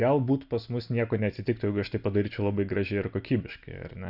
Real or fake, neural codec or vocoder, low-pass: real; none; 5.4 kHz